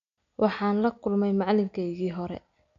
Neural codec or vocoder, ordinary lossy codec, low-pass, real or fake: none; none; 7.2 kHz; real